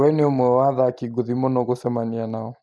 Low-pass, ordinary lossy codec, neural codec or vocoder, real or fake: none; none; none; real